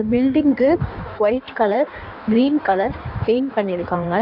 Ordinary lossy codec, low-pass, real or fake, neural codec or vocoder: none; 5.4 kHz; fake; codec, 16 kHz in and 24 kHz out, 1.1 kbps, FireRedTTS-2 codec